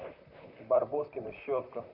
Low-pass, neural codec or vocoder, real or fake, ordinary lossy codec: 5.4 kHz; vocoder, 44.1 kHz, 128 mel bands, Pupu-Vocoder; fake; none